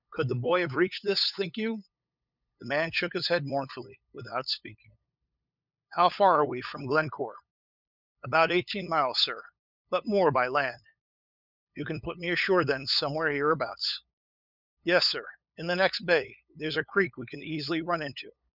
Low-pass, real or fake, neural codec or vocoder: 5.4 kHz; fake; codec, 16 kHz, 8 kbps, FunCodec, trained on LibriTTS, 25 frames a second